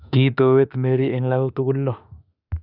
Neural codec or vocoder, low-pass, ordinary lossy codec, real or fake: autoencoder, 48 kHz, 32 numbers a frame, DAC-VAE, trained on Japanese speech; 5.4 kHz; none; fake